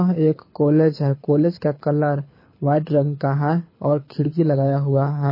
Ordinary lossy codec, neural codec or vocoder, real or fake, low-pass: MP3, 24 kbps; codec, 24 kHz, 6 kbps, HILCodec; fake; 5.4 kHz